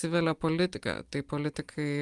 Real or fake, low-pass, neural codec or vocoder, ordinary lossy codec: real; 10.8 kHz; none; Opus, 32 kbps